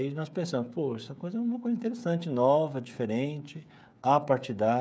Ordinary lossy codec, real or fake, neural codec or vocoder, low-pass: none; fake; codec, 16 kHz, 16 kbps, FreqCodec, smaller model; none